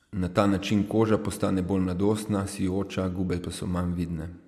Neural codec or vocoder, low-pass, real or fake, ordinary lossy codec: none; 14.4 kHz; real; AAC, 96 kbps